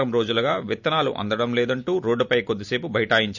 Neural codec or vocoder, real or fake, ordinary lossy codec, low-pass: none; real; none; 7.2 kHz